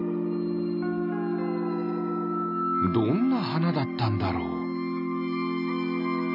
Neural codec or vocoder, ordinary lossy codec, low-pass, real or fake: none; none; 5.4 kHz; real